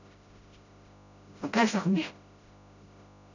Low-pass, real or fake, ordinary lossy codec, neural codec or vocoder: 7.2 kHz; fake; none; codec, 16 kHz, 0.5 kbps, FreqCodec, smaller model